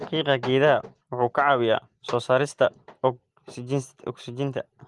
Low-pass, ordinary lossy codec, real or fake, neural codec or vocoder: 10.8 kHz; Opus, 24 kbps; real; none